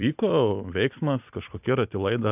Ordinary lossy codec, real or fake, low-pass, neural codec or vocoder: AAC, 32 kbps; fake; 3.6 kHz; vocoder, 44.1 kHz, 128 mel bands every 256 samples, BigVGAN v2